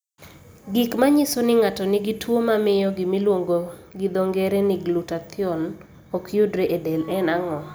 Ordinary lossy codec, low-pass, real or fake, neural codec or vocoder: none; none; real; none